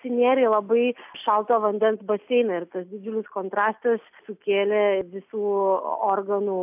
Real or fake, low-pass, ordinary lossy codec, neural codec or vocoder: real; 3.6 kHz; AAC, 32 kbps; none